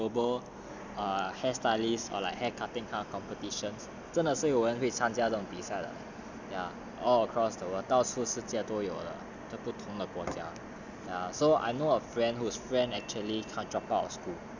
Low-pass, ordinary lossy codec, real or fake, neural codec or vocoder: 7.2 kHz; none; real; none